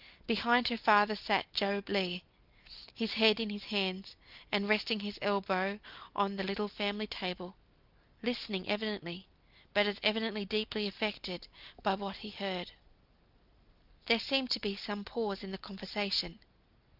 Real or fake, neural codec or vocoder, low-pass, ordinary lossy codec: real; none; 5.4 kHz; Opus, 24 kbps